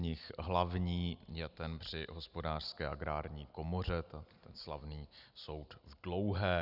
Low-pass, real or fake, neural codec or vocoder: 5.4 kHz; real; none